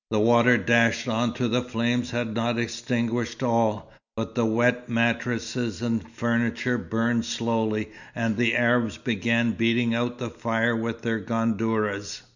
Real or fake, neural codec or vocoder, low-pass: real; none; 7.2 kHz